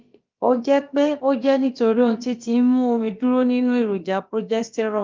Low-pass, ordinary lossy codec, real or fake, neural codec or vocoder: 7.2 kHz; Opus, 24 kbps; fake; codec, 16 kHz, about 1 kbps, DyCAST, with the encoder's durations